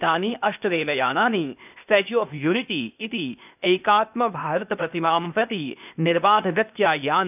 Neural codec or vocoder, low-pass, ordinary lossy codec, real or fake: codec, 16 kHz, 0.8 kbps, ZipCodec; 3.6 kHz; none; fake